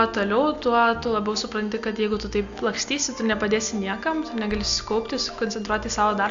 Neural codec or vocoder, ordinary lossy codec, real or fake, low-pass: none; AAC, 64 kbps; real; 7.2 kHz